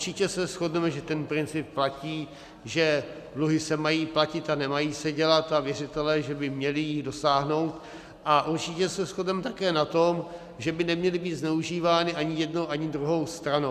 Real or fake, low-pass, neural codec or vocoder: real; 14.4 kHz; none